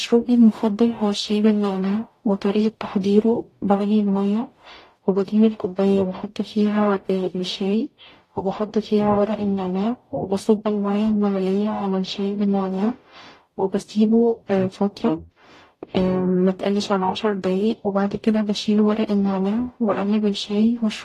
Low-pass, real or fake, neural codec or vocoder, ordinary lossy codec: 14.4 kHz; fake; codec, 44.1 kHz, 0.9 kbps, DAC; AAC, 48 kbps